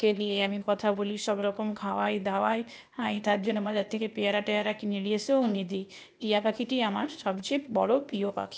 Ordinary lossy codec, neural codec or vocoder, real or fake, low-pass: none; codec, 16 kHz, 0.8 kbps, ZipCodec; fake; none